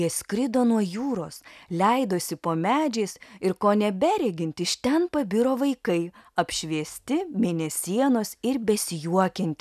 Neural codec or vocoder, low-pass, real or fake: none; 14.4 kHz; real